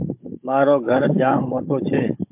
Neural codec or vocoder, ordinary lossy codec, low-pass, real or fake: codec, 16 kHz, 16 kbps, FunCodec, trained on Chinese and English, 50 frames a second; MP3, 32 kbps; 3.6 kHz; fake